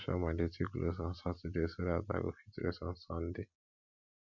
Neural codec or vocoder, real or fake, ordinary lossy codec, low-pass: none; real; none; 7.2 kHz